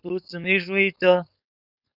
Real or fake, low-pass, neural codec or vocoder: fake; 5.4 kHz; codec, 16 kHz, 4.8 kbps, FACodec